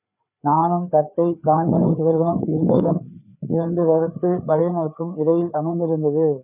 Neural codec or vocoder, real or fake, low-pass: codec, 16 kHz, 4 kbps, FreqCodec, larger model; fake; 3.6 kHz